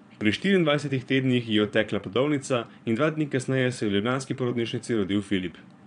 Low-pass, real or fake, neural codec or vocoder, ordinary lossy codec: 9.9 kHz; fake; vocoder, 22.05 kHz, 80 mel bands, Vocos; none